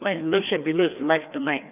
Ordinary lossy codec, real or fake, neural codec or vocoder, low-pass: none; fake; codec, 16 kHz, 2 kbps, FreqCodec, larger model; 3.6 kHz